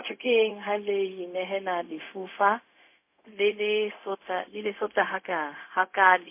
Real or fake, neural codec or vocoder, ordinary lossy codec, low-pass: fake; codec, 16 kHz, 0.4 kbps, LongCat-Audio-Codec; MP3, 24 kbps; 3.6 kHz